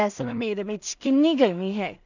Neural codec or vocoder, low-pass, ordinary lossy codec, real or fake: codec, 16 kHz in and 24 kHz out, 0.4 kbps, LongCat-Audio-Codec, two codebook decoder; 7.2 kHz; none; fake